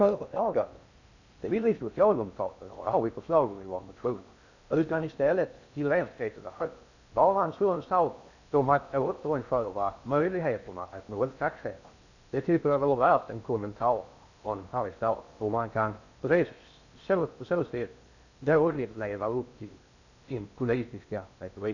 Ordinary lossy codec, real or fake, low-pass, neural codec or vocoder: none; fake; 7.2 kHz; codec, 16 kHz in and 24 kHz out, 0.6 kbps, FocalCodec, streaming, 4096 codes